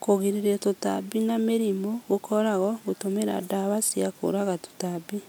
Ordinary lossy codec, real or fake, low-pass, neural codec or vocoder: none; real; none; none